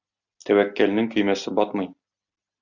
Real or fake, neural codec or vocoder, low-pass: real; none; 7.2 kHz